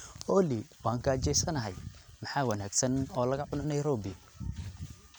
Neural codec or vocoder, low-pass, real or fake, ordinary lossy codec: none; none; real; none